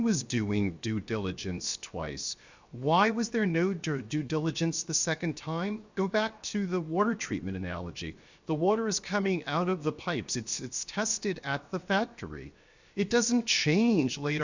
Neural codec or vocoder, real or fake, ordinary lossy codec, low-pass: codec, 16 kHz, 0.7 kbps, FocalCodec; fake; Opus, 64 kbps; 7.2 kHz